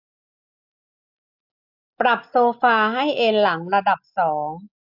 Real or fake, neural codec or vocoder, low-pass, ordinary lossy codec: real; none; 5.4 kHz; AAC, 48 kbps